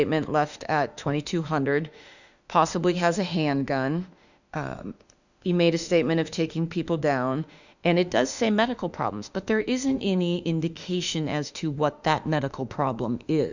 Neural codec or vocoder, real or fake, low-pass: autoencoder, 48 kHz, 32 numbers a frame, DAC-VAE, trained on Japanese speech; fake; 7.2 kHz